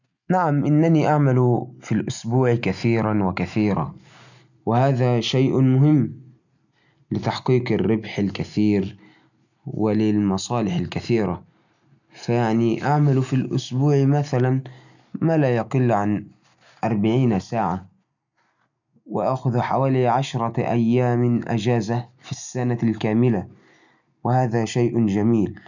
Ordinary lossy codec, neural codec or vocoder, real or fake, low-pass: none; none; real; 7.2 kHz